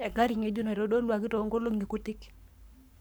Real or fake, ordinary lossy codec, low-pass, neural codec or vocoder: fake; none; none; codec, 44.1 kHz, 7.8 kbps, Pupu-Codec